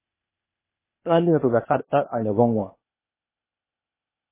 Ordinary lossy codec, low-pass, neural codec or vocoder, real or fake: MP3, 16 kbps; 3.6 kHz; codec, 16 kHz, 0.8 kbps, ZipCodec; fake